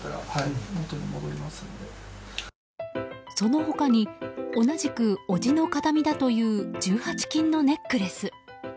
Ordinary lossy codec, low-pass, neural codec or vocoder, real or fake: none; none; none; real